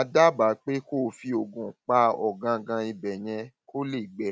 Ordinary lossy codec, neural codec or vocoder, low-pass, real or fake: none; none; none; real